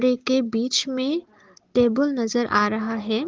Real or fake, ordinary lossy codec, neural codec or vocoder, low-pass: real; Opus, 16 kbps; none; 7.2 kHz